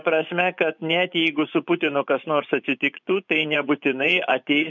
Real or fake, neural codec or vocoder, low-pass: fake; vocoder, 24 kHz, 100 mel bands, Vocos; 7.2 kHz